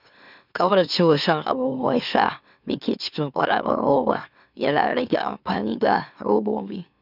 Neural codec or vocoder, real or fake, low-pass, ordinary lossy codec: autoencoder, 44.1 kHz, a latent of 192 numbers a frame, MeloTTS; fake; 5.4 kHz; none